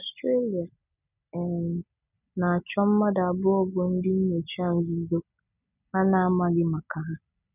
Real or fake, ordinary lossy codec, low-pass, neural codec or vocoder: real; none; 3.6 kHz; none